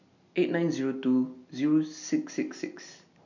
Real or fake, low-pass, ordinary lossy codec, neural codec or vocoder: real; 7.2 kHz; none; none